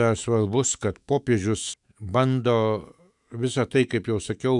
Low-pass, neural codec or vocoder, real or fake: 10.8 kHz; autoencoder, 48 kHz, 128 numbers a frame, DAC-VAE, trained on Japanese speech; fake